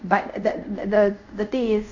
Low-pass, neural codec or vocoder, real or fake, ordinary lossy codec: 7.2 kHz; codec, 24 kHz, 0.5 kbps, DualCodec; fake; MP3, 64 kbps